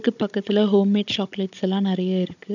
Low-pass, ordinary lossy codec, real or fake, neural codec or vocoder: 7.2 kHz; none; fake; codec, 16 kHz, 16 kbps, FunCodec, trained on Chinese and English, 50 frames a second